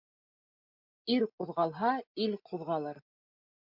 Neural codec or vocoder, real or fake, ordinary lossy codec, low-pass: none; real; MP3, 48 kbps; 5.4 kHz